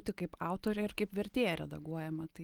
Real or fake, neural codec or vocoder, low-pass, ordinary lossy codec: real; none; 19.8 kHz; Opus, 24 kbps